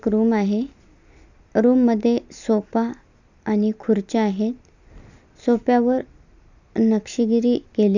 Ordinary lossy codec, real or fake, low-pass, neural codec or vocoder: none; real; 7.2 kHz; none